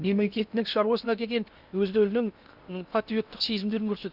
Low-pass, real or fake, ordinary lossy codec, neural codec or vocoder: 5.4 kHz; fake; Opus, 64 kbps; codec, 16 kHz in and 24 kHz out, 0.6 kbps, FocalCodec, streaming, 2048 codes